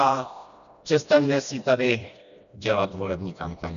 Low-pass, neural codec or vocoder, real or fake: 7.2 kHz; codec, 16 kHz, 1 kbps, FreqCodec, smaller model; fake